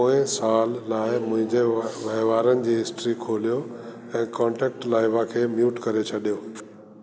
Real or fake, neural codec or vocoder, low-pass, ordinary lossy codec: real; none; none; none